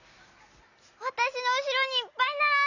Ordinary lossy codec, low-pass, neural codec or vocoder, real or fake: none; 7.2 kHz; none; real